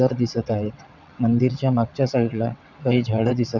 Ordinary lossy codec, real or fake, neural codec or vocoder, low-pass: none; fake; vocoder, 22.05 kHz, 80 mel bands, WaveNeXt; 7.2 kHz